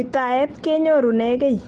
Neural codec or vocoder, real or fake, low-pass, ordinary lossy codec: none; real; 10.8 kHz; Opus, 16 kbps